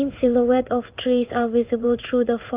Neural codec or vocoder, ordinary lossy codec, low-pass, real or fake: codec, 16 kHz in and 24 kHz out, 1 kbps, XY-Tokenizer; Opus, 24 kbps; 3.6 kHz; fake